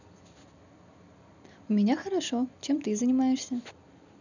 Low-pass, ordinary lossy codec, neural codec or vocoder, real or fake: 7.2 kHz; none; none; real